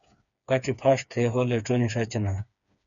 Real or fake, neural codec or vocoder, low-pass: fake; codec, 16 kHz, 4 kbps, FreqCodec, smaller model; 7.2 kHz